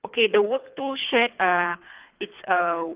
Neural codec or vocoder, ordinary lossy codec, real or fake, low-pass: codec, 24 kHz, 3 kbps, HILCodec; Opus, 32 kbps; fake; 3.6 kHz